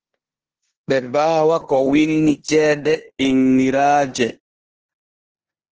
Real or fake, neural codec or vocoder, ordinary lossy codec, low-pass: fake; codec, 16 kHz in and 24 kHz out, 0.9 kbps, LongCat-Audio-Codec, four codebook decoder; Opus, 16 kbps; 7.2 kHz